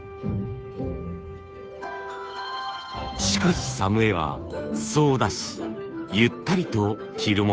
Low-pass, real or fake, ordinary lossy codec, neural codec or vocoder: none; fake; none; codec, 16 kHz, 2 kbps, FunCodec, trained on Chinese and English, 25 frames a second